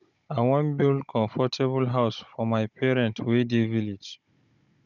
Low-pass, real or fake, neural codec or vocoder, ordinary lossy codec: 7.2 kHz; fake; codec, 16 kHz, 16 kbps, FunCodec, trained on Chinese and English, 50 frames a second; none